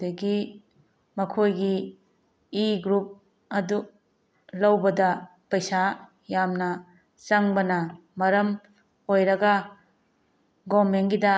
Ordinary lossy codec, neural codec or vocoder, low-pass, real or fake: none; none; none; real